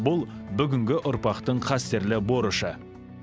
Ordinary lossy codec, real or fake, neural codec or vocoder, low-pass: none; real; none; none